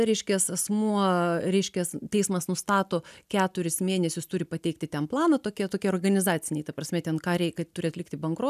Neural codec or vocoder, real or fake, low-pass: none; real; 14.4 kHz